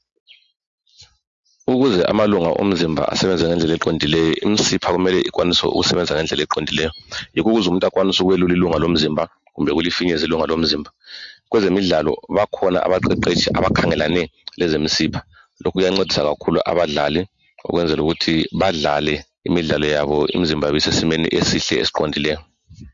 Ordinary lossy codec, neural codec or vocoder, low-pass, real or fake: MP3, 48 kbps; none; 7.2 kHz; real